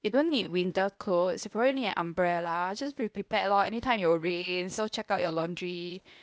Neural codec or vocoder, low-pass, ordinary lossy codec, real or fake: codec, 16 kHz, 0.8 kbps, ZipCodec; none; none; fake